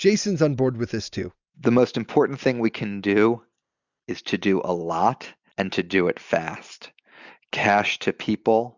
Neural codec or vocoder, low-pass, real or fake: none; 7.2 kHz; real